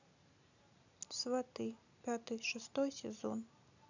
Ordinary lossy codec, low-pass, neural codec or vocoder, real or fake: none; 7.2 kHz; none; real